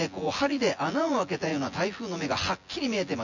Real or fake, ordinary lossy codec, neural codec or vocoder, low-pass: fake; none; vocoder, 24 kHz, 100 mel bands, Vocos; 7.2 kHz